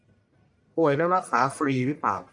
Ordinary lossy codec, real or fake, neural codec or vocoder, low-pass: MP3, 96 kbps; fake; codec, 44.1 kHz, 1.7 kbps, Pupu-Codec; 10.8 kHz